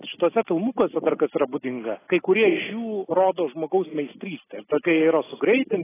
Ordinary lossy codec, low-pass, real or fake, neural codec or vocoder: AAC, 16 kbps; 3.6 kHz; real; none